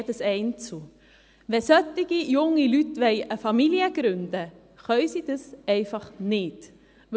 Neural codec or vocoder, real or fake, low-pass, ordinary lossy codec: none; real; none; none